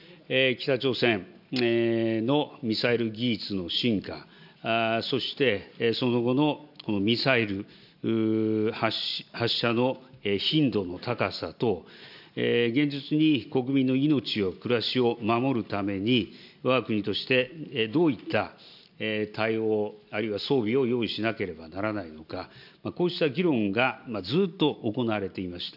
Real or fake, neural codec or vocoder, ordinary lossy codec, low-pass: real; none; none; 5.4 kHz